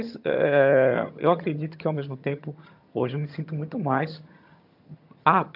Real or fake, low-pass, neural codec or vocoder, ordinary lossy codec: fake; 5.4 kHz; vocoder, 22.05 kHz, 80 mel bands, HiFi-GAN; AAC, 48 kbps